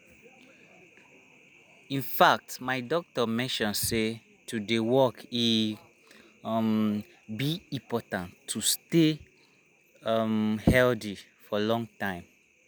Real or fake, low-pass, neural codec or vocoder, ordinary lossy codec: real; none; none; none